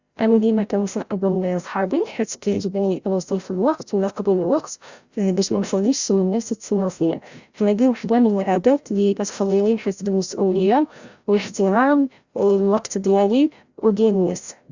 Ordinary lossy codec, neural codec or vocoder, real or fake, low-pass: Opus, 64 kbps; codec, 16 kHz, 0.5 kbps, FreqCodec, larger model; fake; 7.2 kHz